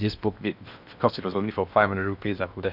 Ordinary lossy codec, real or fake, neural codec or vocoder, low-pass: none; fake; codec, 16 kHz in and 24 kHz out, 0.8 kbps, FocalCodec, streaming, 65536 codes; 5.4 kHz